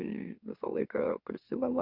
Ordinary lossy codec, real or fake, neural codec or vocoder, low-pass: Opus, 64 kbps; fake; autoencoder, 44.1 kHz, a latent of 192 numbers a frame, MeloTTS; 5.4 kHz